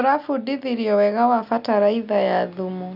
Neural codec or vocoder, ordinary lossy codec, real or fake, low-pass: none; none; real; 5.4 kHz